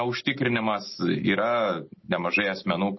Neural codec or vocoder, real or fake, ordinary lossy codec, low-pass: none; real; MP3, 24 kbps; 7.2 kHz